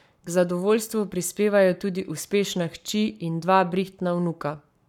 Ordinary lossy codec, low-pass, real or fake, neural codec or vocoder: none; 19.8 kHz; fake; codec, 44.1 kHz, 7.8 kbps, Pupu-Codec